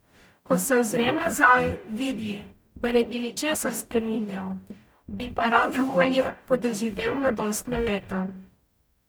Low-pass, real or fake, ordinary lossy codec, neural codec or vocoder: none; fake; none; codec, 44.1 kHz, 0.9 kbps, DAC